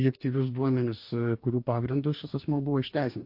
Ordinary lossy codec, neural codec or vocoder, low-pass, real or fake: MP3, 32 kbps; codec, 44.1 kHz, 2.6 kbps, DAC; 5.4 kHz; fake